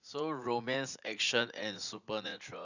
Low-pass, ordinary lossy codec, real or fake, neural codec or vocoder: 7.2 kHz; none; fake; vocoder, 44.1 kHz, 80 mel bands, Vocos